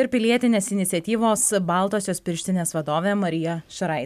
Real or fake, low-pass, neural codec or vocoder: real; 14.4 kHz; none